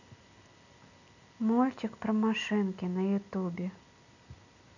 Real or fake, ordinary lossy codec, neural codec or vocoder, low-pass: real; none; none; 7.2 kHz